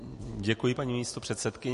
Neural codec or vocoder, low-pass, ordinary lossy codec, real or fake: none; 14.4 kHz; MP3, 48 kbps; real